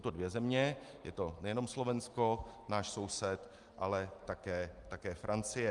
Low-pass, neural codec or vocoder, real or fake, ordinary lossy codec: 10.8 kHz; none; real; Opus, 32 kbps